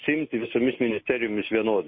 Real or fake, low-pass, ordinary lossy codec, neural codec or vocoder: real; 7.2 kHz; MP3, 24 kbps; none